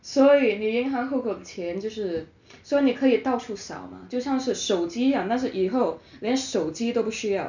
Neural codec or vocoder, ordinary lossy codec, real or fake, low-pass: none; none; real; 7.2 kHz